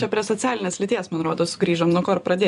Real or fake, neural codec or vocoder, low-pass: fake; vocoder, 24 kHz, 100 mel bands, Vocos; 10.8 kHz